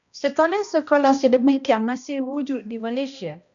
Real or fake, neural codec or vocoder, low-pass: fake; codec, 16 kHz, 1 kbps, X-Codec, HuBERT features, trained on balanced general audio; 7.2 kHz